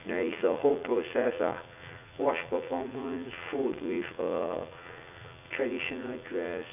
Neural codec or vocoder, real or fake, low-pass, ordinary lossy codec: vocoder, 44.1 kHz, 80 mel bands, Vocos; fake; 3.6 kHz; none